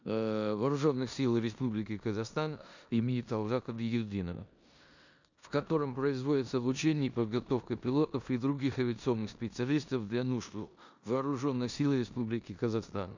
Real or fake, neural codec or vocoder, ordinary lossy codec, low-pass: fake; codec, 16 kHz in and 24 kHz out, 0.9 kbps, LongCat-Audio-Codec, four codebook decoder; none; 7.2 kHz